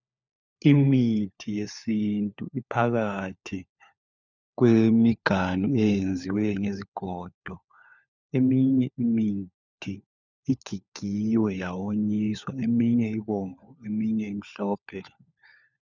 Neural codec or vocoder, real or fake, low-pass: codec, 16 kHz, 4 kbps, FunCodec, trained on LibriTTS, 50 frames a second; fake; 7.2 kHz